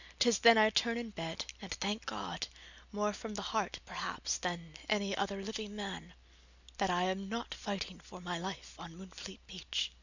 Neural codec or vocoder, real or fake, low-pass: none; real; 7.2 kHz